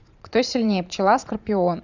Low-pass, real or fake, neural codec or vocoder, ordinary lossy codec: 7.2 kHz; fake; vocoder, 22.05 kHz, 80 mel bands, Vocos; none